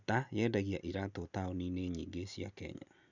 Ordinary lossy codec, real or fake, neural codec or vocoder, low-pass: none; real; none; 7.2 kHz